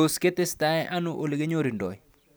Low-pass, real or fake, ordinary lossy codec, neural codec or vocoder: none; real; none; none